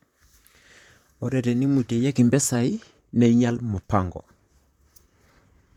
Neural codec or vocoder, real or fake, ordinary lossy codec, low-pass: vocoder, 44.1 kHz, 128 mel bands, Pupu-Vocoder; fake; none; 19.8 kHz